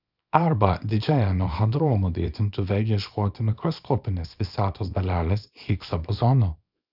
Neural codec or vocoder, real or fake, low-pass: codec, 24 kHz, 0.9 kbps, WavTokenizer, small release; fake; 5.4 kHz